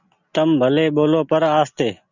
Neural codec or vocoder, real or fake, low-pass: none; real; 7.2 kHz